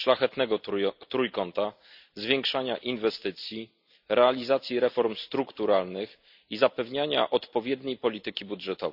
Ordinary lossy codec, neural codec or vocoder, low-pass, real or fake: none; none; 5.4 kHz; real